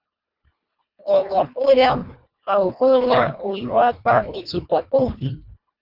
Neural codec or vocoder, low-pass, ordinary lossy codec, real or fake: codec, 24 kHz, 1.5 kbps, HILCodec; 5.4 kHz; Opus, 64 kbps; fake